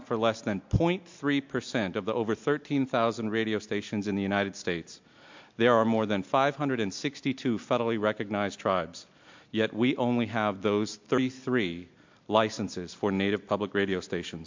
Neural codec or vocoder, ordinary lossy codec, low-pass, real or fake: none; MP3, 64 kbps; 7.2 kHz; real